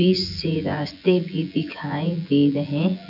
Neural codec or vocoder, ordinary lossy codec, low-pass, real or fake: vocoder, 24 kHz, 100 mel bands, Vocos; none; 5.4 kHz; fake